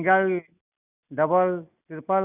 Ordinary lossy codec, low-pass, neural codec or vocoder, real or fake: none; 3.6 kHz; none; real